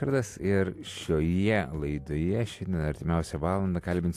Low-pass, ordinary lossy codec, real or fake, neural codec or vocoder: 14.4 kHz; AAC, 64 kbps; real; none